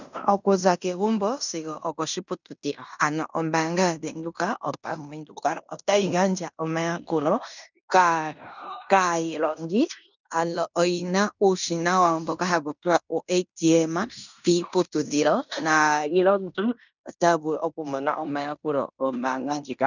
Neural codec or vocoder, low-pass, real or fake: codec, 16 kHz in and 24 kHz out, 0.9 kbps, LongCat-Audio-Codec, fine tuned four codebook decoder; 7.2 kHz; fake